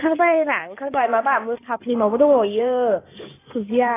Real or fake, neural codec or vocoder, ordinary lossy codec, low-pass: fake; codec, 16 kHz in and 24 kHz out, 2.2 kbps, FireRedTTS-2 codec; AAC, 16 kbps; 3.6 kHz